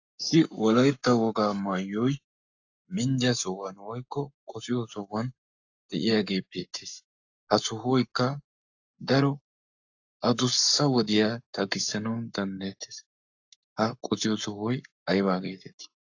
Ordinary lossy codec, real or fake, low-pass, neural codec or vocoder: AAC, 48 kbps; fake; 7.2 kHz; codec, 44.1 kHz, 7.8 kbps, Pupu-Codec